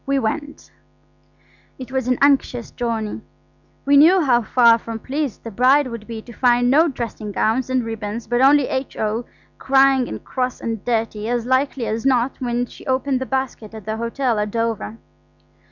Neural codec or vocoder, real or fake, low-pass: none; real; 7.2 kHz